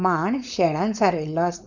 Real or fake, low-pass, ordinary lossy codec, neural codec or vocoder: fake; 7.2 kHz; none; codec, 16 kHz, 4.8 kbps, FACodec